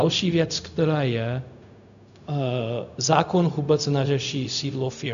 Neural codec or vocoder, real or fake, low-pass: codec, 16 kHz, 0.4 kbps, LongCat-Audio-Codec; fake; 7.2 kHz